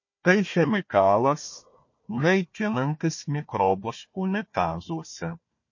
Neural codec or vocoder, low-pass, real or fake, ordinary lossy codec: codec, 16 kHz, 1 kbps, FunCodec, trained on Chinese and English, 50 frames a second; 7.2 kHz; fake; MP3, 32 kbps